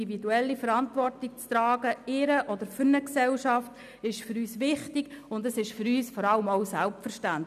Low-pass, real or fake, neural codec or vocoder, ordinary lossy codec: 14.4 kHz; real; none; none